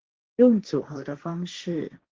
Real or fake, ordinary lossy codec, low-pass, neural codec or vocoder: fake; Opus, 16 kbps; 7.2 kHz; codec, 16 kHz, 1.1 kbps, Voila-Tokenizer